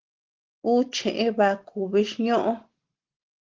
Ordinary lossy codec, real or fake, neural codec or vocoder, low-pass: Opus, 16 kbps; real; none; 7.2 kHz